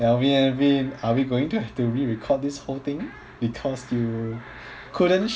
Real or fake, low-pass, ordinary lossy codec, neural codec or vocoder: real; none; none; none